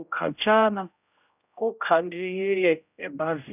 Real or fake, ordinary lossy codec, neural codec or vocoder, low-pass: fake; none; codec, 16 kHz, 0.5 kbps, X-Codec, HuBERT features, trained on general audio; 3.6 kHz